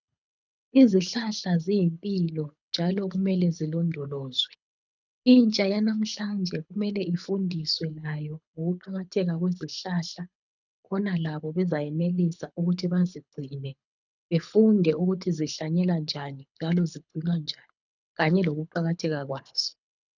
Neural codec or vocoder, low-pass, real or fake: codec, 24 kHz, 6 kbps, HILCodec; 7.2 kHz; fake